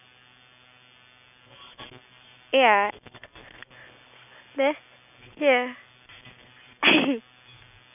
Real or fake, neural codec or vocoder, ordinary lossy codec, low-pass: real; none; none; 3.6 kHz